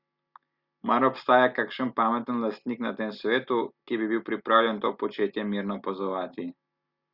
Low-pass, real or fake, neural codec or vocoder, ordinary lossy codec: 5.4 kHz; real; none; Opus, 64 kbps